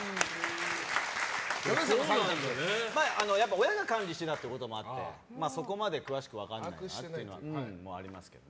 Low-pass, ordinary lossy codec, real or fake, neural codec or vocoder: none; none; real; none